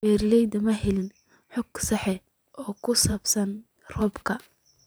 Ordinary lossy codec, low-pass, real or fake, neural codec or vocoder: none; none; fake; vocoder, 44.1 kHz, 128 mel bands every 512 samples, BigVGAN v2